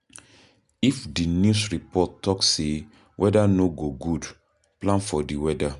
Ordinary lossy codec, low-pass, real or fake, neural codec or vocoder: none; 10.8 kHz; real; none